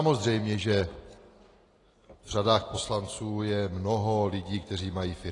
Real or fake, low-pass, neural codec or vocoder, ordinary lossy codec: real; 10.8 kHz; none; AAC, 32 kbps